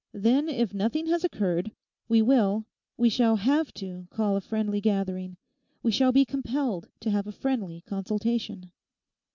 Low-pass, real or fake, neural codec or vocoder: 7.2 kHz; real; none